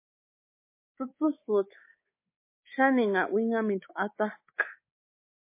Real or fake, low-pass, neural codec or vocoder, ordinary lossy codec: fake; 3.6 kHz; codec, 24 kHz, 3.1 kbps, DualCodec; MP3, 24 kbps